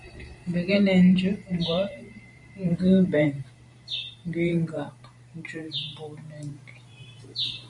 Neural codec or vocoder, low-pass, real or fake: vocoder, 44.1 kHz, 128 mel bands every 512 samples, BigVGAN v2; 10.8 kHz; fake